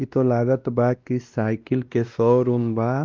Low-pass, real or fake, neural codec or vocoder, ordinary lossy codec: 7.2 kHz; fake; codec, 16 kHz, 1 kbps, X-Codec, WavLM features, trained on Multilingual LibriSpeech; Opus, 24 kbps